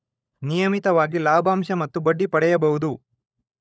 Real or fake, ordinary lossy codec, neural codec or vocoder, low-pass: fake; none; codec, 16 kHz, 16 kbps, FunCodec, trained on LibriTTS, 50 frames a second; none